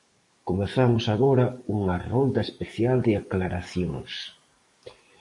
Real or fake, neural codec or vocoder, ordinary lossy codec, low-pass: fake; codec, 44.1 kHz, 7.8 kbps, DAC; MP3, 48 kbps; 10.8 kHz